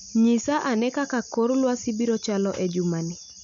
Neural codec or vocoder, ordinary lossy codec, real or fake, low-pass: none; none; real; 7.2 kHz